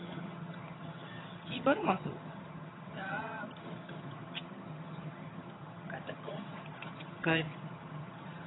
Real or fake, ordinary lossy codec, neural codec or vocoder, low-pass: fake; AAC, 16 kbps; vocoder, 22.05 kHz, 80 mel bands, HiFi-GAN; 7.2 kHz